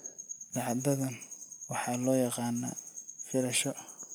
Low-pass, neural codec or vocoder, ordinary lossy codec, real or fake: none; none; none; real